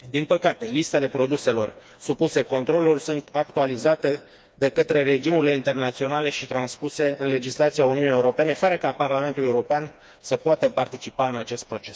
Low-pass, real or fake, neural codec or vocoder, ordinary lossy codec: none; fake; codec, 16 kHz, 2 kbps, FreqCodec, smaller model; none